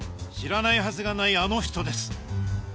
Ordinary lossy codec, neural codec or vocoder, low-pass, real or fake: none; none; none; real